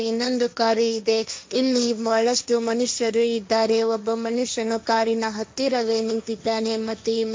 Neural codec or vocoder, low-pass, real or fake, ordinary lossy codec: codec, 16 kHz, 1.1 kbps, Voila-Tokenizer; none; fake; none